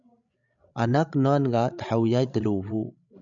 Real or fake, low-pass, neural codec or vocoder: fake; 7.2 kHz; codec, 16 kHz, 16 kbps, FreqCodec, larger model